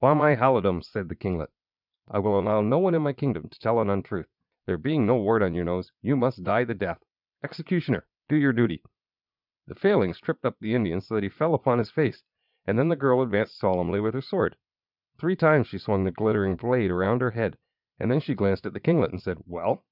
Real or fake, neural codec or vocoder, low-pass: fake; vocoder, 22.05 kHz, 80 mel bands, Vocos; 5.4 kHz